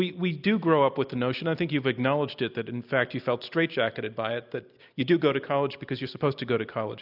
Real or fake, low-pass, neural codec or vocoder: real; 5.4 kHz; none